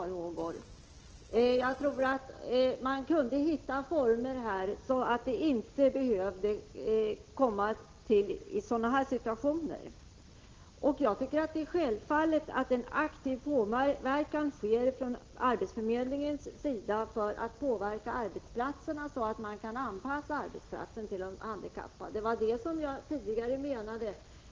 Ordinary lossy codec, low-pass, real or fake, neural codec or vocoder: Opus, 16 kbps; 7.2 kHz; real; none